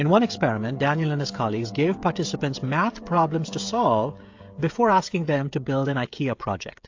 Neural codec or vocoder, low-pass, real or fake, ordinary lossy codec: codec, 16 kHz, 16 kbps, FreqCodec, smaller model; 7.2 kHz; fake; AAC, 48 kbps